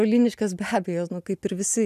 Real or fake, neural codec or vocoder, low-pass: real; none; 14.4 kHz